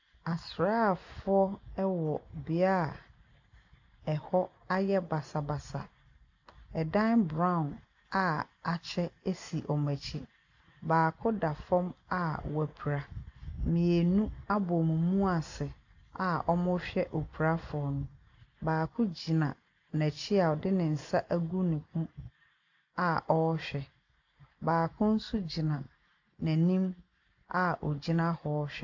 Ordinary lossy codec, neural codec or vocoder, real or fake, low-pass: AAC, 48 kbps; none; real; 7.2 kHz